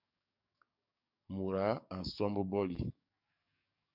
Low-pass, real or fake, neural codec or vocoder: 5.4 kHz; fake; codec, 44.1 kHz, 7.8 kbps, DAC